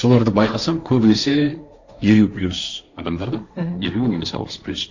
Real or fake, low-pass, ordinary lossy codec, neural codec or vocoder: fake; 7.2 kHz; Opus, 64 kbps; codec, 16 kHz, 1.1 kbps, Voila-Tokenizer